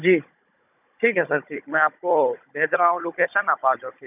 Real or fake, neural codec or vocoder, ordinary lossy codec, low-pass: fake; codec, 16 kHz, 16 kbps, FunCodec, trained on Chinese and English, 50 frames a second; none; 3.6 kHz